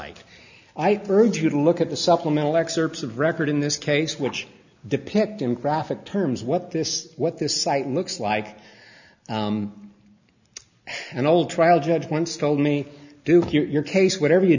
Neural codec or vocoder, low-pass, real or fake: none; 7.2 kHz; real